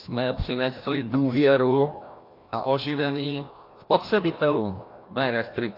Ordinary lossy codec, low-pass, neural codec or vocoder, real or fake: AAC, 32 kbps; 5.4 kHz; codec, 16 kHz, 1 kbps, FreqCodec, larger model; fake